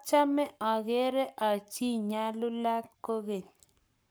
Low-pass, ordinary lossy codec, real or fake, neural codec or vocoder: none; none; fake; codec, 44.1 kHz, 7.8 kbps, Pupu-Codec